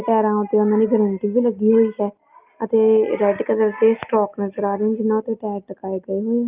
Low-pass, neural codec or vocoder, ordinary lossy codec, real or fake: 3.6 kHz; none; Opus, 32 kbps; real